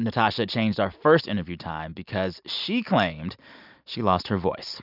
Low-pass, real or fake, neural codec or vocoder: 5.4 kHz; real; none